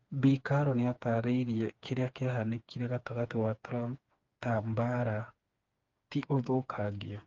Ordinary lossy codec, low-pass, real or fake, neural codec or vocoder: Opus, 32 kbps; 7.2 kHz; fake; codec, 16 kHz, 4 kbps, FreqCodec, smaller model